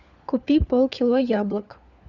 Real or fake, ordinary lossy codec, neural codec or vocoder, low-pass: fake; Opus, 64 kbps; codec, 16 kHz, 4 kbps, FunCodec, trained on LibriTTS, 50 frames a second; 7.2 kHz